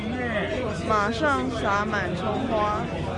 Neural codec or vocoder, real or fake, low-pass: none; real; 10.8 kHz